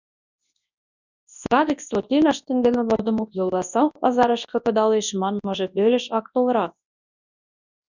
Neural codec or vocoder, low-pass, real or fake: codec, 24 kHz, 0.9 kbps, WavTokenizer, large speech release; 7.2 kHz; fake